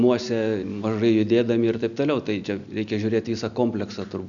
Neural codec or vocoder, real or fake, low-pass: none; real; 7.2 kHz